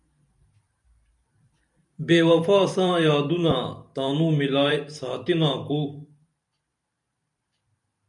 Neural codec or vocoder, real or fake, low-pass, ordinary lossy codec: vocoder, 44.1 kHz, 128 mel bands every 512 samples, BigVGAN v2; fake; 10.8 kHz; MP3, 96 kbps